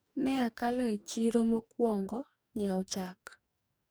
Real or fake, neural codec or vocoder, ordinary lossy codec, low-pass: fake; codec, 44.1 kHz, 2.6 kbps, DAC; none; none